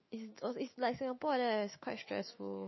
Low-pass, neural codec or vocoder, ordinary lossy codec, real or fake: 7.2 kHz; autoencoder, 48 kHz, 128 numbers a frame, DAC-VAE, trained on Japanese speech; MP3, 24 kbps; fake